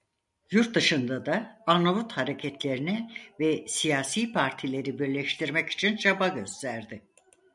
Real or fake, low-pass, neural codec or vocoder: real; 10.8 kHz; none